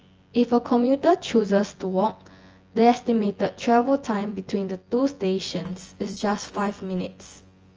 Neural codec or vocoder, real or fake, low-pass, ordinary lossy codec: vocoder, 24 kHz, 100 mel bands, Vocos; fake; 7.2 kHz; Opus, 24 kbps